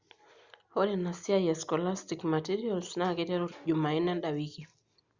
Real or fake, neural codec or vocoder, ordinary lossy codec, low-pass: real; none; Opus, 64 kbps; 7.2 kHz